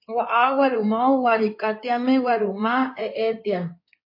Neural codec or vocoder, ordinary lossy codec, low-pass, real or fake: codec, 16 kHz in and 24 kHz out, 2.2 kbps, FireRedTTS-2 codec; MP3, 32 kbps; 5.4 kHz; fake